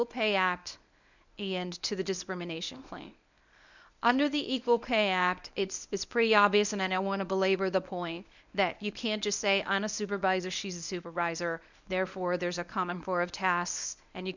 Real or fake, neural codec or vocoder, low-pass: fake; codec, 24 kHz, 0.9 kbps, WavTokenizer, medium speech release version 1; 7.2 kHz